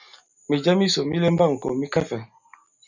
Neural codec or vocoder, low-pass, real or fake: none; 7.2 kHz; real